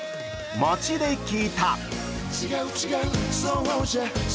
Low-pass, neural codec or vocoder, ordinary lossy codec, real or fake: none; none; none; real